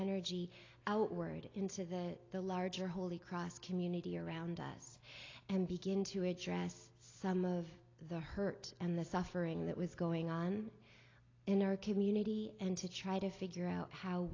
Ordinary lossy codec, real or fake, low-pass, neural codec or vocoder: AAC, 32 kbps; real; 7.2 kHz; none